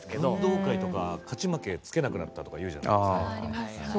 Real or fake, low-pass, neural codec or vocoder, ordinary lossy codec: real; none; none; none